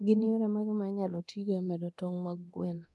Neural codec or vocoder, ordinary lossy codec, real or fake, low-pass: codec, 24 kHz, 0.9 kbps, DualCodec; none; fake; none